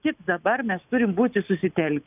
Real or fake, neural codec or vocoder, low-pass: real; none; 3.6 kHz